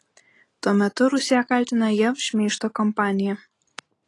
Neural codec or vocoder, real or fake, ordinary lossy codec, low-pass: none; real; AAC, 32 kbps; 10.8 kHz